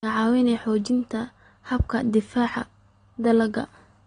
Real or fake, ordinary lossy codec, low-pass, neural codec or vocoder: real; AAC, 32 kbps; 9.9 kHz; none